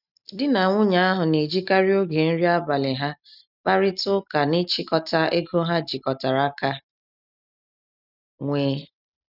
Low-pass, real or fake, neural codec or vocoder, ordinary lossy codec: 5.4 kHz; real; none; none